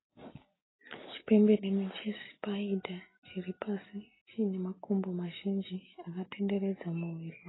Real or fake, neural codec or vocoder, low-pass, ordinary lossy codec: real; none; 7.2 kHz; AAC, 16 kbps